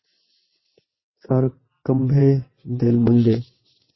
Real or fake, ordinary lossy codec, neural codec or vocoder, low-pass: fake; MP3, 24 kbps; vocoder, 22.05 kHz, 80 mel bands, WaveNeXt; 7.2 kHz